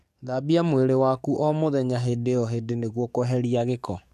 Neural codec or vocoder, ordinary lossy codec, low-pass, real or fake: codec, 44.1 kHz, 7.8 kbps, DAC; MP3, 96 kbps; 14.4 kHz; fake